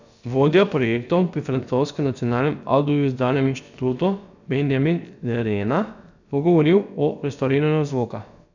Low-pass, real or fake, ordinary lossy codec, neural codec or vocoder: 7.2 kHz; fake; none; codec, 16 kHz, about 1 kbps, DyCAST, with the encoder's durations